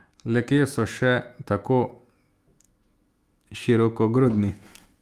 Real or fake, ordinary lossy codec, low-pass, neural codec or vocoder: fake; Opus, 32 kbps; 14.4 kHz; autoencoder, 48 kHz, 128 numbers a frame, DAC-VAE, trained on Japanese speech